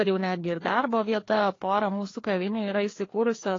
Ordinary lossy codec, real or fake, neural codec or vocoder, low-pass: AAC, 32 kbps; fake; codec, 16 kHz, 2 kbps, FreqCodec, larger model; 7.2 kHz